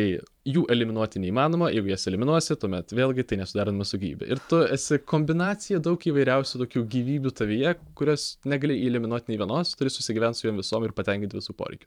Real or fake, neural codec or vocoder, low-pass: fake; vocoder, 44.1 kHz, 128 mel bands every 512 samples, BigVGAN v2; 19.8 kHz